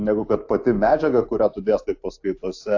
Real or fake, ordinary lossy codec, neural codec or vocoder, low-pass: real; AAC, 48 kbps; none; 7.2 kHz